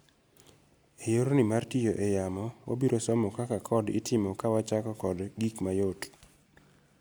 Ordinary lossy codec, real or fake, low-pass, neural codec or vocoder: none; real; none; none